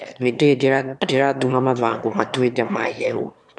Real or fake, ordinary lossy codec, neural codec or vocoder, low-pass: fake; none; autoencoder, 22.05 kHz, a latent of 192 numbers a frame, VITS, trained on one speaker; none